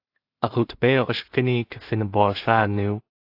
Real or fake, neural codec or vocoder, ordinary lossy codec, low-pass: fake; codec, 16 kHz in and 24 kHz out, 0.4 kbps, LongCat-Audio-Codec, two codebook decoder; AAC, 32 kbps; 5.4 kHz